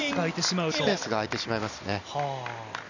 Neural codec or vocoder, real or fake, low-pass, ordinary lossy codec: none; real; 7.2 kHz; none